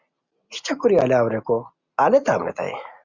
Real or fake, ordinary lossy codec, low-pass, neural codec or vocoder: real; Opus, 64 kbps; 7.2 kHz; none